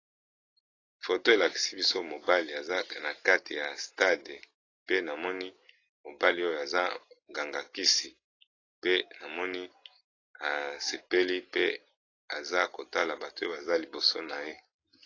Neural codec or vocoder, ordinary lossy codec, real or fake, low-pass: none; AAC, 32 kbps; real; 7.2 kHz